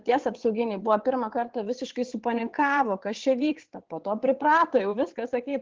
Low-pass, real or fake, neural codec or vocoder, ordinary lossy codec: 7.2 kHz; fake; vocoder, 22.05 kHz, 80 mel bands, WaveNeXt; Opus, 16 kbps